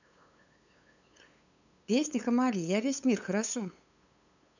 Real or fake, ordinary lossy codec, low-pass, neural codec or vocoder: fake; none; 7.2 kHz; codec, 16 kHz, 8 kbps, FunCodec, trained on LibriTTS, 25 frames a second